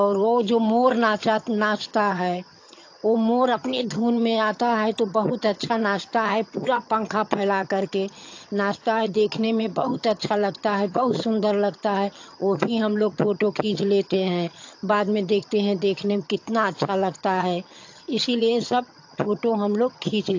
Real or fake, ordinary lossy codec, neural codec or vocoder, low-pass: fake; AAC, 48 kbps; vocoder, 22.05 kHz, 80 mel bands, HiFi-GAN; 7.2 kHz